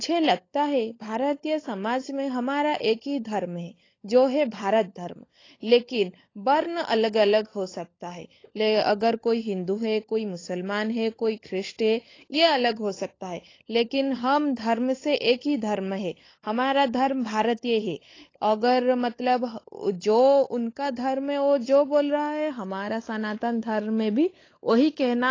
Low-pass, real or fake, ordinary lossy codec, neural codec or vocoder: 7.2 kHz; fake; AAC, 32 kbps; codec, 16 kHz, 16 kbps, FunCodec, trained on LibriTTS, 50 frames a second